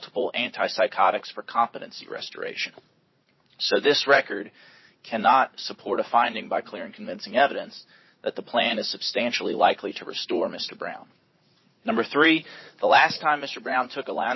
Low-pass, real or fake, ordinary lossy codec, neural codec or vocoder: 7.2 kHz; fake; MP3, 24 kbps; vocoder, 44.1 kHz, 80 mel bands, Vocos